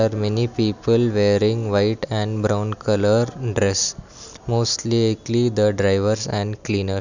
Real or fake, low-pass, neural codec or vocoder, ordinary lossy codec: real; 7.2 kHz; none; none